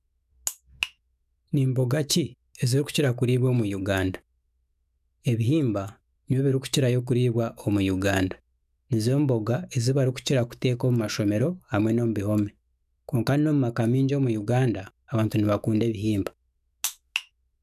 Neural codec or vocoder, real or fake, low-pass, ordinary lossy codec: autoencoder, 48 kHz, 128 numbers a frame, DAC-VAE, trained on Japanese speech; fake; 14.4 kHz; none